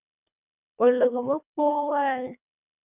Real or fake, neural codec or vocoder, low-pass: fake; codec, 24 kHz, 1.5 kbps, HILCodec; 3.6 kHz